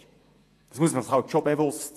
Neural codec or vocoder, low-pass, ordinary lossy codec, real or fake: vocoder, 48 kHz, 128 mel bands, Vocos; 14.4 kHz; AAC, 64 kbps; fake